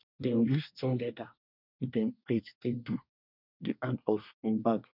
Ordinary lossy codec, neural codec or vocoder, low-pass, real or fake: none; codec, 24 kHz, 1 kbps, SNAC; 5.4 kHz; fake